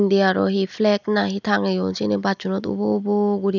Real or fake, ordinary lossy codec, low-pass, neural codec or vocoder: real; none; 7.2 kHz; none